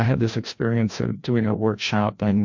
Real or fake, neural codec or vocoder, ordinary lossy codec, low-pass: fake; codec, 16 kHz, 1 kbps, FreqCodec, larger model; MP3, 48 kbps; 7.2 kHz